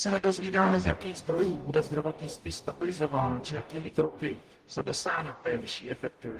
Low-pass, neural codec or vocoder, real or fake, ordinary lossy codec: 14.4 kHz; codec, 44.1 kHz, 0.9 kbps, DAC; fake; Opus, 24 kbps